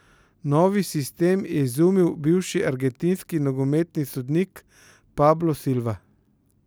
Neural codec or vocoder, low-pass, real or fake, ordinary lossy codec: none; none; real; none